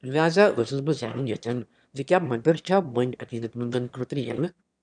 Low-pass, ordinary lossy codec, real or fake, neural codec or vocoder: 9.9 kHz; none; fake; autoencoder, 22.05 kHz, a latent of 192 numbers a frame, VITS, trained on one speaker